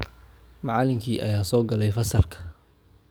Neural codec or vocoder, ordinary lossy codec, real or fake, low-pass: codec, 44.1 kHz, 7.8 kbps, DAC; none; fake; none